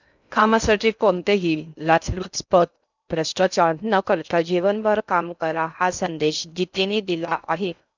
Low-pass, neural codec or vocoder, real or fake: 7.2 kHz; codec, 16 kHz in and 24 kHz out, 0.6 kbps, FocalCodec, streaming, 2048 codes; fake